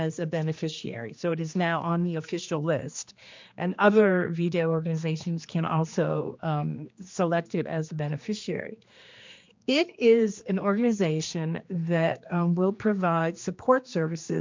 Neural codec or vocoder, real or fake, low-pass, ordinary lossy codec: codec, 16 kHz, 2 kbps, X-Codec, HuBERT features, trained on general audio; fake; 7.2 kHz; MP3, 64 kbps